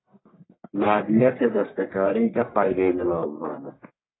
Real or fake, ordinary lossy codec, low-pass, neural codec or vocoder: fake; AAC, 16 kbps; 7.2 kHz; codec, 44.1 kHz, 1.7 kbps, Pupu-Codec